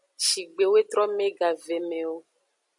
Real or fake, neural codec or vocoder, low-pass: real; none; 10.8 kHz